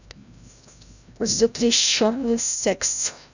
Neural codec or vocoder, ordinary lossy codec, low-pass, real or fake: codec, 16 kHz, 0.5 kbps, FreqCodec, larger model; none; 7.2 kHz; fake